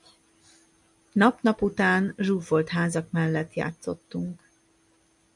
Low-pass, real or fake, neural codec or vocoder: 10.8 kHz; real; none